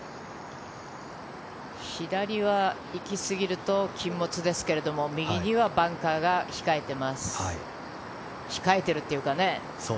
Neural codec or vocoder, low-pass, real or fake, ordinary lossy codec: none; none; real; none